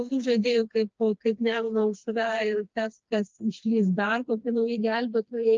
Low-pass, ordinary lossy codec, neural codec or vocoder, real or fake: 7.2 kHz; Opus, 24 kbps; codec, 16 kHz, 2 kbps, FreqCodec, smaller model; fake